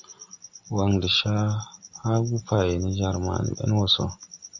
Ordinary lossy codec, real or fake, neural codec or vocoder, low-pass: AAC, 48 kbps; real; none; 7.2 kHz